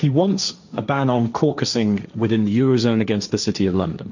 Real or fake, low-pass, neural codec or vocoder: fake; 7.2 kHz; codec, 16 kHz, 1.1 kbps, Voila-Tokenizer